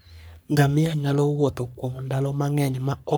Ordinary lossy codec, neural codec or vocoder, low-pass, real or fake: none; codec, 44.1 kHz, 3.4 kbps, Pupu-Codec; none; fake